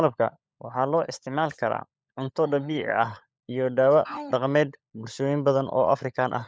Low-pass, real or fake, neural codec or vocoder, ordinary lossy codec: none; fake; codec, 16 kHz, 16 kbps, FunCodec, trained on LibriTTS, 50 frames a second; none